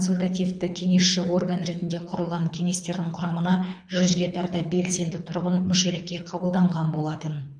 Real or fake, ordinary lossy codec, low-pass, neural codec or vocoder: fake; none; 9.9 kHz; codec, 24 kHz, 3 kbps, HILCodec